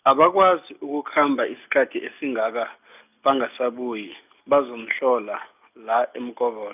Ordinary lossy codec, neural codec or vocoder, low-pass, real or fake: none; none; 3.6 kHz; real